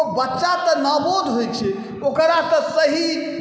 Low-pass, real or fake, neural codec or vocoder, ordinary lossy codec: none; real; none; none